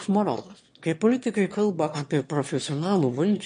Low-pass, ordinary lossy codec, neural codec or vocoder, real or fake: 9.9 kHz; MP3, 48 kbps; autoencoder, 22.05 kHz, a latent of 192 numbers a frame, VITS, trained on one speaker; fake